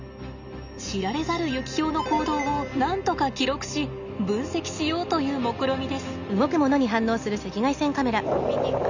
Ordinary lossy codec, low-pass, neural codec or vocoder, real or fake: none; 7.2 kHz; none; real